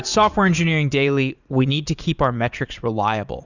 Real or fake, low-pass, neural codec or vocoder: fake; 7.2 kHz; vocoder, 44.1 kHz, 80 mel bands, Vocos